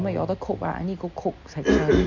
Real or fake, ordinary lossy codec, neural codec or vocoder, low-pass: real; none; none; 7.2 kHz